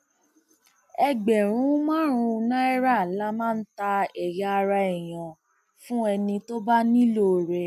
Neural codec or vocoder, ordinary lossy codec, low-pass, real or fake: none; none; 14.4 kHz; real